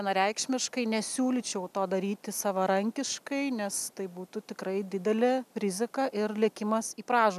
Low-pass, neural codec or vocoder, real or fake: 14.4 kHz; none; real